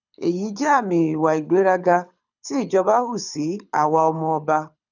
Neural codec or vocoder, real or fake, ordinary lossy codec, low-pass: codec, 24 kHz, 6 kbps, HILCodec; fake; none; 7.2 kHz